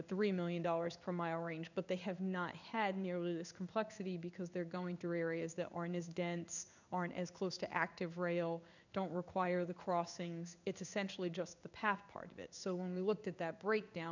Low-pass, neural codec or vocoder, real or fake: 7.2 kHz; codec, 16 kHz in and 24 kHz out, 1 kbps, XY-Tokenizer; fake